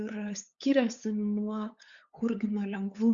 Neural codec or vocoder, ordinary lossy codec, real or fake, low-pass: codec, 16 kHz, 8 kbps, FunCodec, trained on LibriTTS, 25 frames a second; Opus, 64 kbps; fake; 7.2 kHz